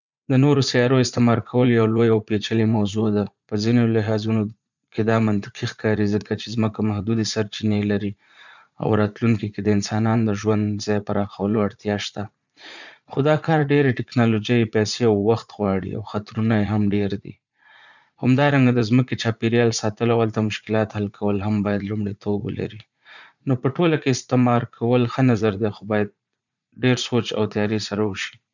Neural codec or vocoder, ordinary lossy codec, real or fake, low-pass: vocoder, 44.1 kHz, 80 mel bands, Vocos; none; fake; 7.2 kHz